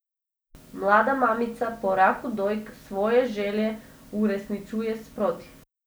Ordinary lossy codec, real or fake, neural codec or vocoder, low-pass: none; real; none; none